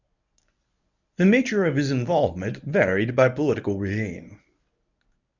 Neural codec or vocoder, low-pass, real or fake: codec, 24 kHz, 0.9 kbps, WavTokenizer, medium speech release version 1; 7.2 kHz; fake